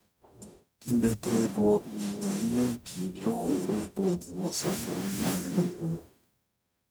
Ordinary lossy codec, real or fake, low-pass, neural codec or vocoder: none; fake; none; codec, 44.1 kHz, 0.9 kbps, DAC